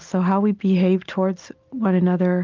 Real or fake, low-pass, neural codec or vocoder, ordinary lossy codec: real; 7.2 kHz; none; Opus, 32 kbps